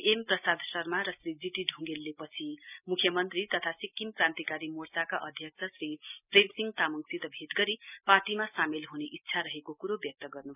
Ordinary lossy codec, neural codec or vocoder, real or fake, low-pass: none; none; real; 3.6 kHz